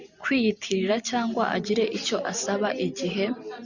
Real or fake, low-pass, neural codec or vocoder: fake; 7.2 kHz; vocoder, 44.1 kHz, 128 mel bands every 512 samples, BigVGAN v2